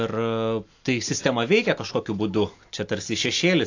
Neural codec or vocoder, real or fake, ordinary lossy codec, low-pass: none; real; AAC, 48 kbps; 7.2 kHz